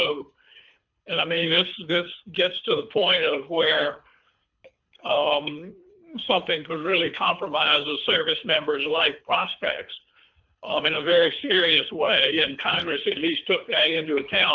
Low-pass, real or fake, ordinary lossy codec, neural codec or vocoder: 7.2 kHz; fake; MP3, 64 kbps; codec, 24 kHz, 3 kbps, HILCodec